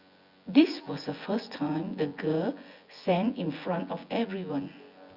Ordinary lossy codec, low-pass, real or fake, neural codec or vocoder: Opus, 64 kbps; 5.4 kHz; fake; vocoder, 24 kHz, 100 mel bands, Vocos